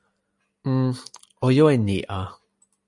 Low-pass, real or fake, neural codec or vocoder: 10.8 kHz; real; none